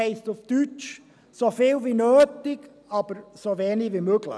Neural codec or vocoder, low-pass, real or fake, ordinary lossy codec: none; none; real; none